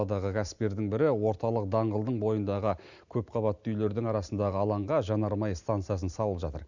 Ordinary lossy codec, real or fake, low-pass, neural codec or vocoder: none; real; 7.2 kHz; none